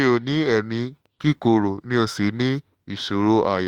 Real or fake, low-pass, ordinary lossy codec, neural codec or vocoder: fake; 19.8 kHz; Opus, 32 kbps; autoencoder, 48 kHz, 32 numbers a frame, DAC-VAE, trained on Japanese speech